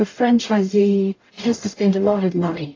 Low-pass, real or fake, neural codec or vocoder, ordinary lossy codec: 7.2 kHz; fake; codec, 44.1 kHz, 0.9 kbps, DAC; AAC, 32 kbps